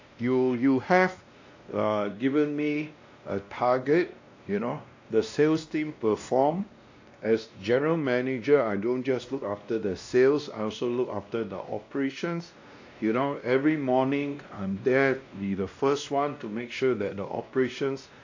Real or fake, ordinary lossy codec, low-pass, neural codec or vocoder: fake; AAC, 48 kbps; 7.2 kHz; codec, 16 kHz, 1 kbps, X-Codec, WavLM features, trained on Multilingual LibriSpeech